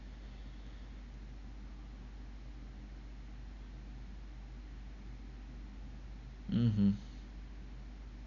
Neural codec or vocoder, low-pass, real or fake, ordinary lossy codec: none; 7.2 kHz; real; none